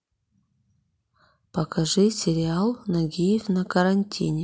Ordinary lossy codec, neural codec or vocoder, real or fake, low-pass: none; none; real; none